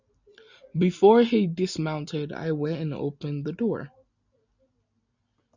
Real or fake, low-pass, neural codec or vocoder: real; 7.2 kHz; none